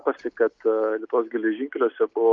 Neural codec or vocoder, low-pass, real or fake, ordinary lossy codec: none; 7.2 kHz; real; Opus, 24 kbps